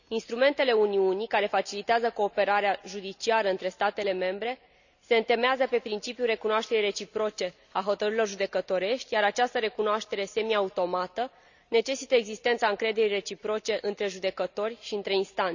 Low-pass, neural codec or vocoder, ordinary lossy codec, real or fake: 7.2 kHz; none; none; real